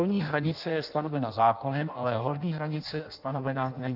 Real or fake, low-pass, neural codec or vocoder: fake; 5.4 kHz; codec, 16 kHz in and 24 kHz out, 0.6 kbps, FireRedTTS-2 codec